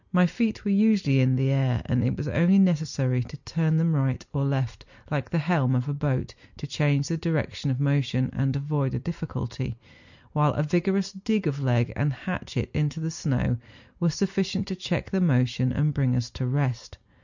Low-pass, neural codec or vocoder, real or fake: 7.2 kHz; none; real